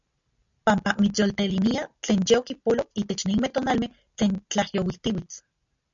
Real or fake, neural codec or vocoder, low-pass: real; none; 7.2 kHz